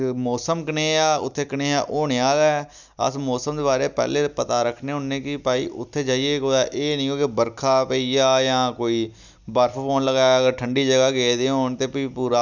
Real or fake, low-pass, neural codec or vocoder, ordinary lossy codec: real; 7.2 kHz; none; none